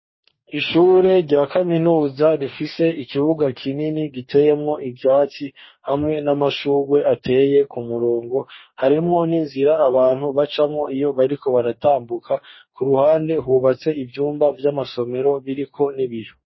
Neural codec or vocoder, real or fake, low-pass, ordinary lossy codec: codec, 44.1 kHz, 2.6 kbps, DAC; fake; 7.2 kHz; MP3, 24 kbps